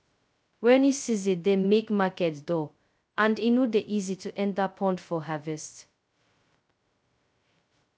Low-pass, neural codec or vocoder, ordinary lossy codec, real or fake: none; codec, 16 kHz, 0.2 kbps, FocalCodec; none; fake